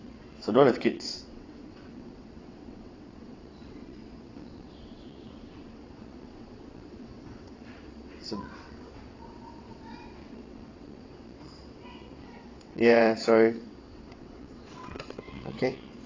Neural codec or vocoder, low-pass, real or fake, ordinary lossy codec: vocoder, 22.05 kHz, 80 mel bands, WaveNeXt; 7.2 kHz; fake; AAC, 32 kbps